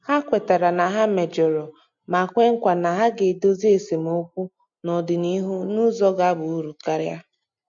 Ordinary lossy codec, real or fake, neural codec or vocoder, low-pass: MP3, 48 kbps; real; none; 7.2 kHz